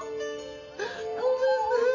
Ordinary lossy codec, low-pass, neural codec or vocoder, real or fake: none; 7.2 kHz; none; real